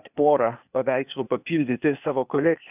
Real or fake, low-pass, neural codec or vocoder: fake; 3.6 kHz; codec, 16 kHz, 0.8 kbps, ZipCodec